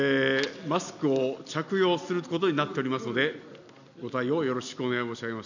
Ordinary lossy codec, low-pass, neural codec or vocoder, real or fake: none; 7.2 kHz; none; real